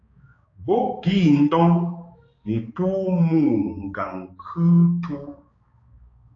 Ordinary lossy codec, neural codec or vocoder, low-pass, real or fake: AAC, 32 kbps; codec, 16 kHz, 4 kbps, X-Codec, HuBERT features, trained on balanced general audio; 7.2 kHz; fake